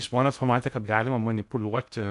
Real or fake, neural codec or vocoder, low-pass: fake; codec, 16 kHz in and 24 kHz out, 0.6 kbps, FocalCodec, streaming, 2048 codes; 10.8 kHz